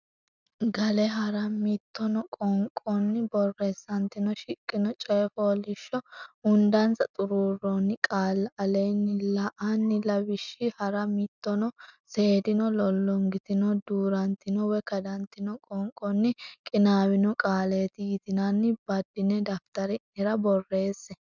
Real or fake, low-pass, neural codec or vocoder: real; 7.2 kHz; none